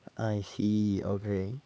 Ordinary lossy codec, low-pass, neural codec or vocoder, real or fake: none; none; codec, 16 kHz, 4 kbps, X-Codec, HuBERT features, trained on LibriSpeech; fake